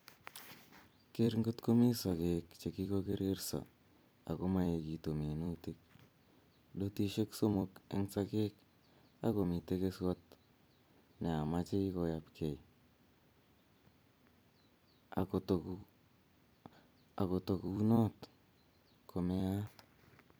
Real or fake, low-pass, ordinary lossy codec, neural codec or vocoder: fake; none; none; vocoder, 44.1 kHz, 128 mel bands every 256 samples, BigVGAN v2